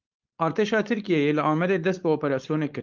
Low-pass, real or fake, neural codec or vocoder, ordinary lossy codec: 7.2 kHz; fake; codec, 16 kHz, 4.8 kbps, FACodec; Opus, 24 kbps